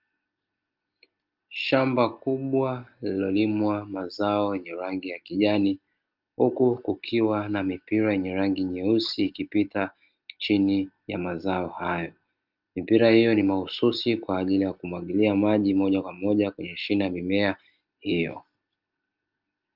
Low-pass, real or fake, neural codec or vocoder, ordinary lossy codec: 5.4 kHz; real; none; Opus, 24 kbps